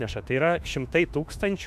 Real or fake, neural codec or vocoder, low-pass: fake; autoencoder, 48 kHz, 32 numbers a frame, DAC-VAE, trained on Japanese speech; 14.4 kHz